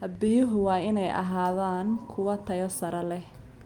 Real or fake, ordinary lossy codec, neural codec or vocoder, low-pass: real; Opus, 32 kbps; none; 19.8 kHz